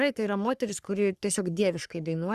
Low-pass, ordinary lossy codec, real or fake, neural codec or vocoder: 14.4 kHz; Opus, 64 kbps; fake; codec, 44.1 kHz, 3.4 kbps, Pupu-Codec